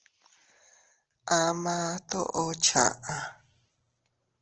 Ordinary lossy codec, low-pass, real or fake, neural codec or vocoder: Opus, 16 kbps; 7.2 kHz; real; none